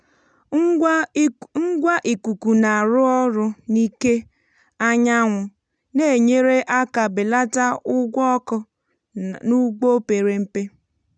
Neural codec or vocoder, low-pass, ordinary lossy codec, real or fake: none; 9.9 kHz; none; real